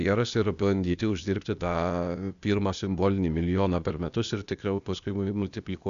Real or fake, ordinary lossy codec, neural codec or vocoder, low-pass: fake; AAC, 96 kbps; codec, 16 kHz, 0.8 kbps, ZipCodec; 7.2 kHz